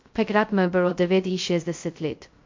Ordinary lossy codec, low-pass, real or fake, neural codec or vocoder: MP3, 48 kbps; 7.2 kHz; fake; codec, 16 kHz, 0.2 kbps, FocalCodec